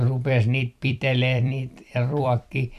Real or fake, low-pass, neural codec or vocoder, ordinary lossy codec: fake; 14.4 kHz; vocoder, 44.1 kHz, 128 mel bands every 256 samples, BigVGAN v2; none